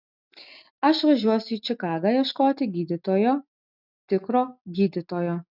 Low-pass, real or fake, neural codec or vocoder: 5.4 kHz; real; none